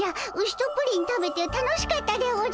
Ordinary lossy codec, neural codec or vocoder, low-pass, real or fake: none; none; none; real